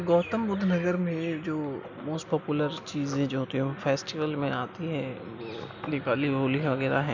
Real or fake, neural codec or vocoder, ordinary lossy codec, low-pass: real; none; none; 7.2 kHz